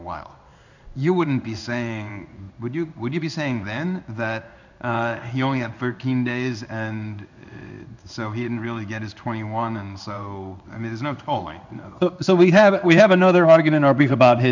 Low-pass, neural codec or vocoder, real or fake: 7.2 kHz; codec, 16 kHz in and 24 kHz out, 1 kbps, XY-Tokenizer; fake